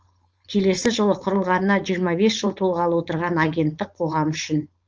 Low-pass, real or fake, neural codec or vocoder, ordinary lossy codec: 7.2 kHz; fake; codec, 16 kHz, 4.8 kbps, FACodec; Opus, 24 kbps